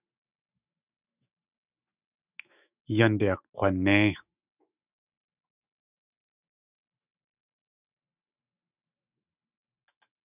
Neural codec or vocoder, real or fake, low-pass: autoencoder, 48 kHz, 128 numbers a frame, DAC-VAE, trained on Japanese speech; fake; 3.6 kHz